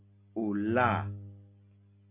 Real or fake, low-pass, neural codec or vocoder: real; 3.6 kHz; none